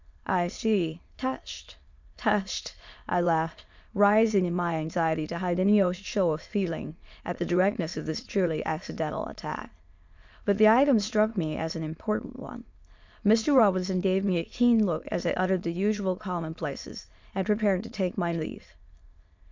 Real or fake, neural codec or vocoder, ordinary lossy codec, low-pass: fake; autoencoder, 22.05 kHz, a latent of 192 numbers a frame, VITS, trained on many speakers; MP3, 64 kbps; 7.2 kHz